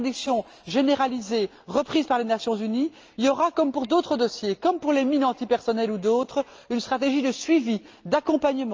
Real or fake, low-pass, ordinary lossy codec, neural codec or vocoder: real; 7.2 kHz; Opus, 24 kbps; none